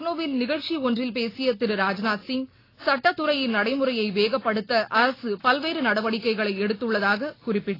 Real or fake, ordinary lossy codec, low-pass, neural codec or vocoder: real; AAC, 24 kbps; 5.4 kHz; none